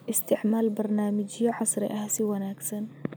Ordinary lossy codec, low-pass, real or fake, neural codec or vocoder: none; none; real; none